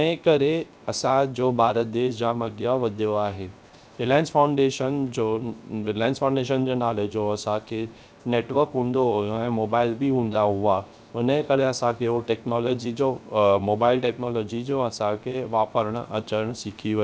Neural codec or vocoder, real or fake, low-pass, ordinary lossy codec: codec, 16 kHz, 0.7 kbps, FocalCodec; fake; none; none